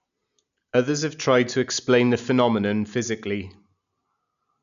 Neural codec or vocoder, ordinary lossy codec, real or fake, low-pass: none; none; real; 7.2 kHz